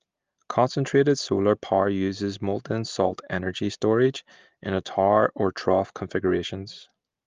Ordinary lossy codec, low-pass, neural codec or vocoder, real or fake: Opus, 32 kbps; 7.2 kHz; none; real